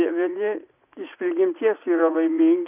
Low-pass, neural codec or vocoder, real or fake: 3.6 kHz; vocoder, 44.1 kHz, 80 mel bands, Vocos; fake